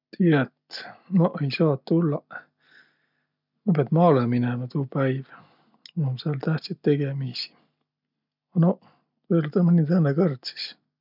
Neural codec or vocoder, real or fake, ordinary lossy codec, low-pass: none; real; none; 5.4 kHz